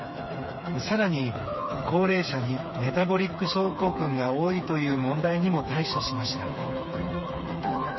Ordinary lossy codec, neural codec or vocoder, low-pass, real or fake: MP3, 24 kbps; codec, 16 kHz, 4 kbps, FreqCodec, smaller model; 7.2 kHz; fake